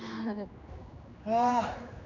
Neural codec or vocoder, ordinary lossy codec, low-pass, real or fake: codec, 16 kHz, 2 kbps, X-Codec, HuBERT features, trained on general audio; none; 7.2 kHz; fake